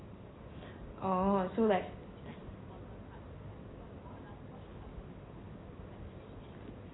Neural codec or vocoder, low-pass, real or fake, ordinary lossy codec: none; 7.2 kHz; real; AAC, 16 kbps